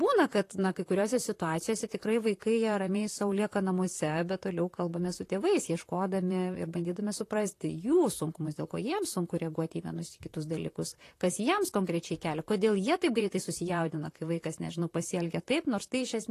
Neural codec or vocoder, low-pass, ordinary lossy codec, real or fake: vocoder, 44.1 kHz, 128 mel bands, Pupu-Vocoder; 14.4 kHz; AAC, 48 kbps; fake